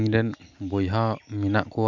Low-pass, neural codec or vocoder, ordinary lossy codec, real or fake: 7.2 kHz; none; none; real